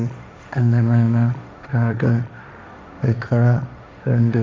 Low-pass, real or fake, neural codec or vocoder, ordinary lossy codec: none; fake; codec, 16 kHz, 1.1 kbps, Voila-Tokenizer; none